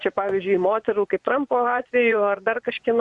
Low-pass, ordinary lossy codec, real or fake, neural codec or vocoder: 10.8 kHz; Opus, 64 kbps; fake; vocoder, 24 kHz, 100 mel bands, Vocos